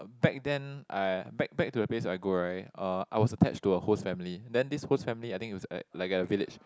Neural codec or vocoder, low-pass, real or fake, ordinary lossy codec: none; none; real; none